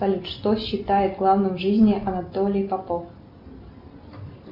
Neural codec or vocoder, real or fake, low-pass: none; real; 5.4 kHz